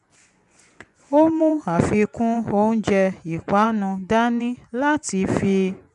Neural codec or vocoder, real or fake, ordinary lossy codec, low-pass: vocoder, 24 kHz, 100 mel bands, Vocos; fake; none; 10.8 kHz